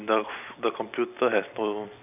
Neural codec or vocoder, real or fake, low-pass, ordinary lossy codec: none; real; 3.6 kHz; none